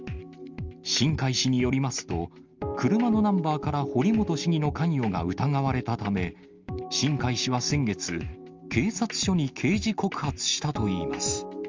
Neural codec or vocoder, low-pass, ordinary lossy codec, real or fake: none; 7.2 kHz; Opus, 32 kbps; real